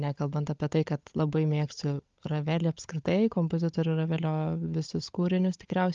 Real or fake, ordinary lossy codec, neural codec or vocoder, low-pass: fake; Opus, 32 kbps; codec, 16 kHz, 16 kbps, FunCodec, trained on LibriTTS, 50 frames a second; 7.2 kHz